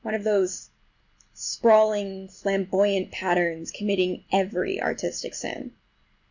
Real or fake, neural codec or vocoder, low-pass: real; none; 7.2 kHz